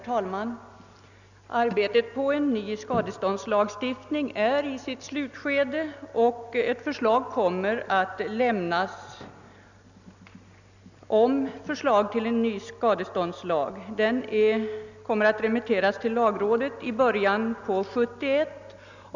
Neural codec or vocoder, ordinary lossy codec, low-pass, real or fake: none; none; 7.2 kHz; real